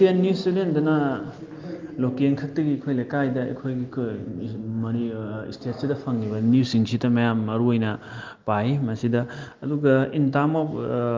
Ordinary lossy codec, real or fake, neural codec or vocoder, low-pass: Opus, 32 kbps; real; none; 7.2 kHz